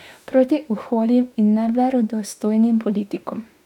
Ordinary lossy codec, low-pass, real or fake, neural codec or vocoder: none; 19.8 kHz; fake; autoencoder, 48 kHz, 32 numbers a frame, DAC-VAE, trained on Japanese speech